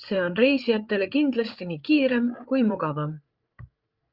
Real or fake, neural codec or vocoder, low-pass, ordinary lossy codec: fake; codec, 16 kHz, 8 kbps, FreqCodec, larger model; 5.4 kHz; Opus, 32 kbps